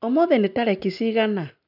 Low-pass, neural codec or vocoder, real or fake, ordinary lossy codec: 5.4 kHz; none; real; none